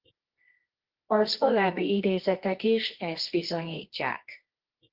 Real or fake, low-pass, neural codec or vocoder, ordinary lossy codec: fake; 5.4 kHz; codec, 24 kHz, 0.9 kbps, WavTokenizer, medium music audio release; Opus, 16 kbps